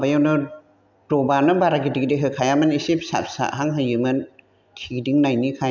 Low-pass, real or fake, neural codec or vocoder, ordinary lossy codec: 7.2 kHz; real; none; none